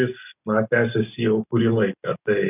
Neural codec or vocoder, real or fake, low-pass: none; real; 3.6 kHz